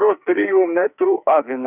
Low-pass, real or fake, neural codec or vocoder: 3.6 kHz; fake; codec, 44.1 kHz, 2.6 kbps, SNAC